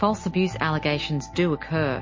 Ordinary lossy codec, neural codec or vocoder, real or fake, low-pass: MP3, 32 kbps; none; real; 7.2 kHz